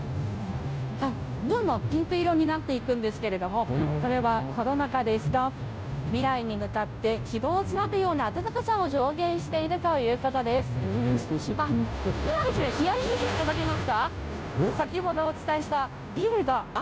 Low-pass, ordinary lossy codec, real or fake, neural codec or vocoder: none; none; fake; codec, 16 kHz, 0.5 kbps, FunCodec, trained on Chinese and English, 25 frames a second